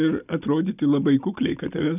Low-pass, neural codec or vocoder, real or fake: 3.6 kHz; none; real